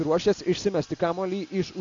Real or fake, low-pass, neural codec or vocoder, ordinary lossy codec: real; 7.2 kHz; none; AAC, 64 kbps